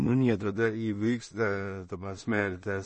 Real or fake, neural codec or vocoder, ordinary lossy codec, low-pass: fake; codec, 16 kHz in and 24 kHz out, 0.4 kbps, LongCat-Audio-Codec, two codebook decoder; MP3, 32 kbps; 10.8 kHz